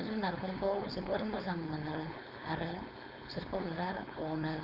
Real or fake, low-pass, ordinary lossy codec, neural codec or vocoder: fake; 5.4 kHz; none; codec, 16 kHz, 4.8 kbps, FACodec